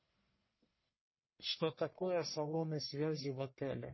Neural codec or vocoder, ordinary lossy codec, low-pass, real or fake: codec, 44.1 kHz, 1.7 kbps, Pupu-Codec; MP3, 24 kbps; 7.2 kHz; fake